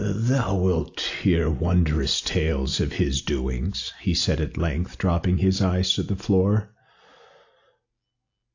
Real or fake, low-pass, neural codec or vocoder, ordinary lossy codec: real; 7.2 kHz; none; AAC, 48 kbps